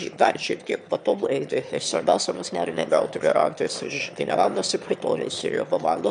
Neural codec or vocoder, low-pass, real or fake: autoencoder, 22.05 kHz, a latent of 192 numbers a frame, VITS, trained on one speaker; 9.9 kHz; fake